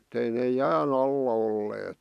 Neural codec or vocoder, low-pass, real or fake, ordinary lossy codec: none; 14.4 kHz; real; none